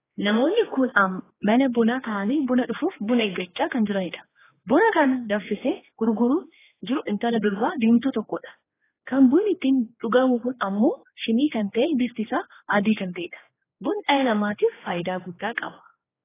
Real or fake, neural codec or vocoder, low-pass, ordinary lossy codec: fake; codec, 16 kHz, 2 kbps, X-Codec, HuBERT features, trained on general audio; 3.6 kHz; AAC, 16 kbps